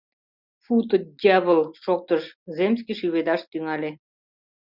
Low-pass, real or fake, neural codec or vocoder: 5.4 kHz; real; none